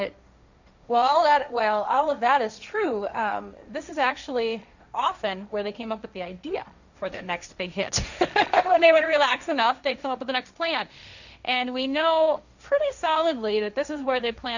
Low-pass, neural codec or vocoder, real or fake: 7.2 kHz; codec, 16 kHz, 1.1 kbps, Voila-Tokenizer; fake